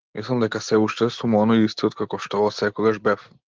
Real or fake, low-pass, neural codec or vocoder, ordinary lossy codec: real; 7.2 kHz; none; Opus, 16 kbps